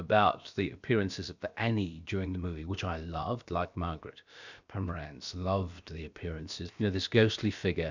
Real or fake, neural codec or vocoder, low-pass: fake; codec, 16 kHz, about 1 kbps, DyCAST, with the encoder's durations; 7.2 kHz